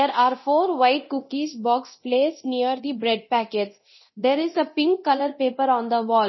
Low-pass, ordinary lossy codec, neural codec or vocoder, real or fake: 7.2 kHz; MP3, 24 kbps; codec, 24 kHz, 0.9 kbps, DualCodec; fake